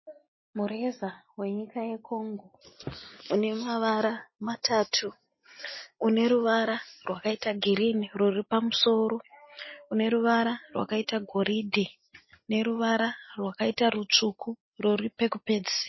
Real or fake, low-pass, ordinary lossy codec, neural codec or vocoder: real; 7.2 kHz; MP3, 24 kbps; none